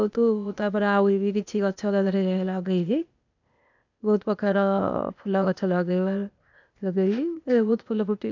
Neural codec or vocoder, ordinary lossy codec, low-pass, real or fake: codec, 16 kHz, 0.8 kbps, ZipCodec; none; 7.2 kHz; fake